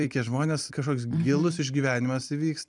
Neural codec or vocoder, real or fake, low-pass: vocoder, 44.1 kHz, 128 mel bands every 256 samples, BigVGAN v2; fake; 10.8 kHz